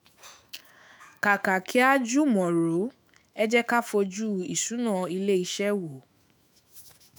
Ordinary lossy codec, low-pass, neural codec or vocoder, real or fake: none; none; autoencoder, 48 kHz, 128 numbers a frame, DAC-VAE, trained on Japanese speech; fake